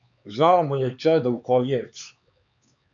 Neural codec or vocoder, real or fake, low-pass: codec, 16 kHz, 4 kbps, X-Codec, HuBERT features, trained on LibriSpeech; fake; 7.2 kHz